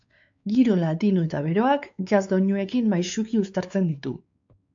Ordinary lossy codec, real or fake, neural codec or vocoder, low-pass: AAC, 64 kbps; fake; codec, 16 kHz, 4 kbps, X-Codec, WavLM features, trained on Multilingual LibriSpeech; 7.2 kHz